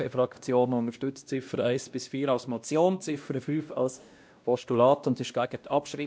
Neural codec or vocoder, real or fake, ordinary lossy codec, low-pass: codec, 16 kHz, 1 kbps, X-Codec, WavLM features, trained on Multilingual LibriSpeech; fake; none; none